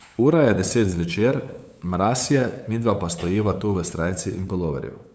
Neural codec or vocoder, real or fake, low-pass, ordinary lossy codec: codec, 16 kHz, 8 kbps, FunCodec, trained on LibriTTS, 25 frames a second; fake; none; none